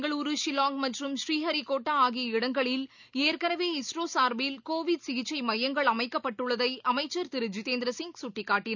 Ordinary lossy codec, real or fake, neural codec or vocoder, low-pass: none; real; none; 7.2 kHz